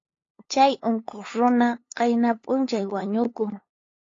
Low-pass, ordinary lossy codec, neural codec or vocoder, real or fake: 7.2 kHz; AAC, 32 kbps; codec, 16 kHz, 8 kbps, FunCodec, trained on LibriTTS, 25 frames a second; fake